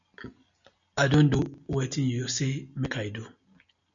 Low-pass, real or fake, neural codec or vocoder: 7.2 kHz; real; none